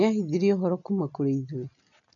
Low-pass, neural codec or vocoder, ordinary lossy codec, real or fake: 7.2 kHz; none; none; real